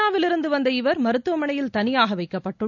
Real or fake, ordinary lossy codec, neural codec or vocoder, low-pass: real; none; none; 7.2 kHz